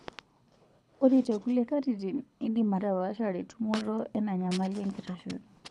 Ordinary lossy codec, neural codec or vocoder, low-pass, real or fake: none; codec, 24 kHz, 6 kbps, HILCodec; none; fake